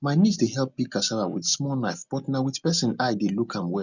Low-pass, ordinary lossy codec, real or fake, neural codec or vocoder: 7.2 kHz; none; real; none